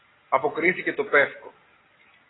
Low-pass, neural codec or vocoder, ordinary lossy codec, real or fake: 7.2 kHz; none; AAC, 16 kbps; real